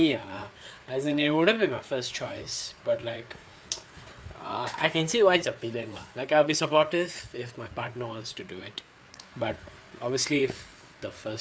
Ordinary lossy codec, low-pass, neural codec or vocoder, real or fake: none; none; codec, 16 kHz, 4 kbps, FreqCodec, larger model; fake